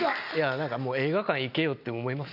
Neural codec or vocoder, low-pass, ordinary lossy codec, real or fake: none; 5.4 kHz; none; real